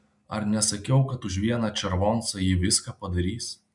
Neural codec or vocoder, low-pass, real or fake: none; 10.8 kHz; real